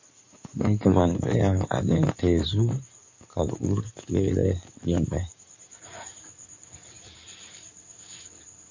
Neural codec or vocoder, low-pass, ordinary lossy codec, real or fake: codec, 16 kHz in and 24 kHz out, 2.2 kbps, FireRedTTS-2 codec; 7.2 kHz; MP3, 48 kbps; fake